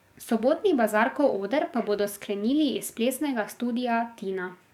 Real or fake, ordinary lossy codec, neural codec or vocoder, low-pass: fake; none; codec, 44.1 kHz, 7.8 kbps, DAC; 19.8 kHz